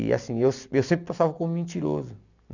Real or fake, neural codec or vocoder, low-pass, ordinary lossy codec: real; none; 7.2 kHz; none